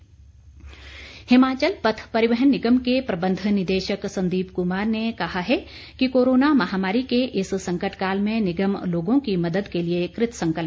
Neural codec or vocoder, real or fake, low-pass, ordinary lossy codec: none; real; none; none